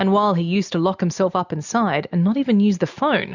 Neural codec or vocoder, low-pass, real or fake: none; 7.2 kHz; real